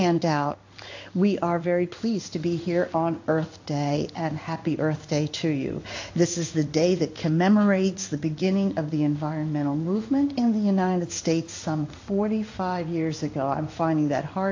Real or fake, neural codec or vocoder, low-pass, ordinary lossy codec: fake; codec, 16 kHz in and 24 kHz out, 1 kbps, XY-Tokenizer; 7.2 kHz; AAC, 48 kbps